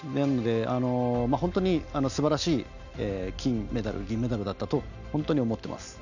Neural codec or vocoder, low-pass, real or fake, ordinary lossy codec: none; 7.2 kHz; real; none